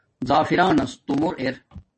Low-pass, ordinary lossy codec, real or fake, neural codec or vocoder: 10.8 kHz; MP3, 32 kbps; real; none